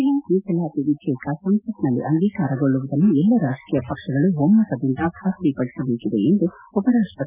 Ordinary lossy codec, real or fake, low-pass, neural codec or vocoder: none; real; 3.6 kHz; none